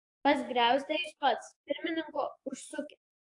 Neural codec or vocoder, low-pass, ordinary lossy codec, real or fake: codec, 44.1 kHz, 7.8 kbps, DAC; 10.8 kHz; AAC, 48 kbps; fake